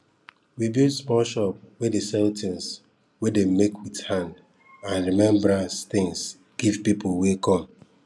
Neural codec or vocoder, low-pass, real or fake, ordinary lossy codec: none; none; real; none